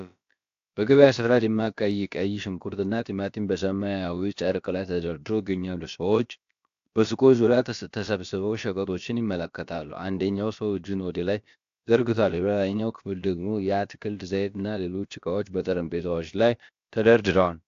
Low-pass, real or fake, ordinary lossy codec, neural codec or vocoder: 7.2 kHz; fake; AAC, 64 kbps; codec, 16 kHz, about 1 kbps, DyCAST, with the encoder's durations